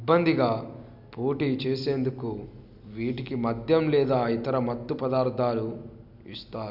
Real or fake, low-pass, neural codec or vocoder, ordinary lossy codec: real; 5.4 kHz; none; none